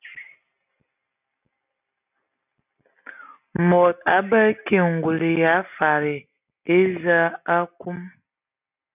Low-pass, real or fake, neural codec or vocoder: 3.6 kHz; real; none